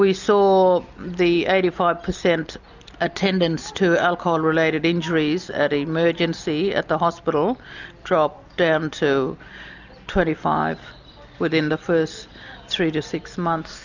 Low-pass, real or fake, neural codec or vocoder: 7.2 kHz; real; none